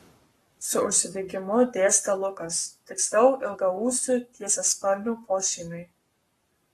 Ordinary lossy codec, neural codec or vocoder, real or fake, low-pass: AAC, 32 kbps; codec, 44.1 kHz, 7.8 kbps, Pupu-Codec; fake; 19.8 kHz